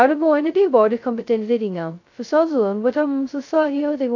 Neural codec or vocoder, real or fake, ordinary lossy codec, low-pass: codec, 16 kHz, 0.2 kbps, FocalCodec; fake; AAC, 48 kbps; 7.2 kHz